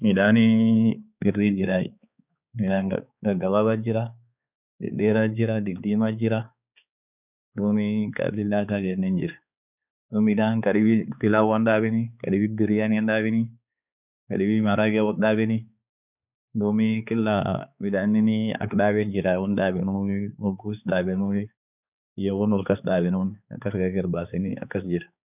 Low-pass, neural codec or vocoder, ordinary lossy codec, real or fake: 3.6 kHz; codec, 16 kHz, 4 kbps, X-Codec, HuBERT features, trained on balanced general audio; none; fake